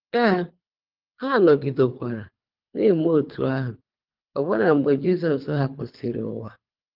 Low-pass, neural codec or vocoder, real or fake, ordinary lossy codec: 5.4 kHz; codec, 24 kHz, 3 kbps, HILCodec; fake; Opus, 24 kbps